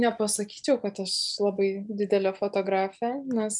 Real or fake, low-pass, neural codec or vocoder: real; 10.8 kHz; none